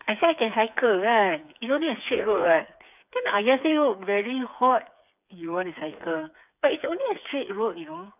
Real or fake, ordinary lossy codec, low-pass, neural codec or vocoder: fake; none; 3.6 kHz; codec, 16 kHz, 4 kbps, FreqCodec, smaller model